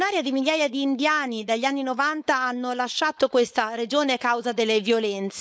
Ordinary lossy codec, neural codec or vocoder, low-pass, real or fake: none; codec, 16 kHz, 4.8 kbps, FACodec; none; fake